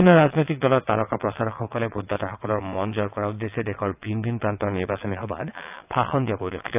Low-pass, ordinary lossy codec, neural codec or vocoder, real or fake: 3.6 kHz; AAC, 32 kbps; vocoder, 22.05 kHz, 80 mel bands, WaveNeXt; fake